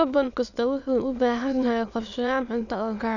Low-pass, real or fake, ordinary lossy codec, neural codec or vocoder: 7.2 kHz; fake; none; autoencoder, 22.05 kHz, a latent of 192 numbers a frame, VITS, trained on many speakers